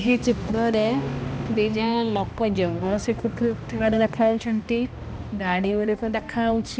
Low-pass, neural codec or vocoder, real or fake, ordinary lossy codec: none; codec, 16 kHz, 1 kbps, X-Codec, HuBERT features, trained on balanced general audio; fake; none